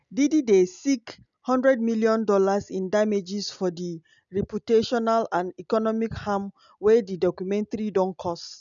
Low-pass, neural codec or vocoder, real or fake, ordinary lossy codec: 7.2 kHz; none; real; none